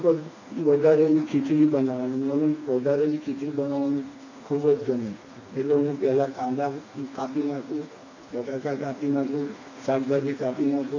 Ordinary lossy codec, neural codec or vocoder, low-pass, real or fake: AAC, 32 kbps; codec, 16 kHz, 2 kbps, FreqCodec, smaller model; 7.2 kHz; fake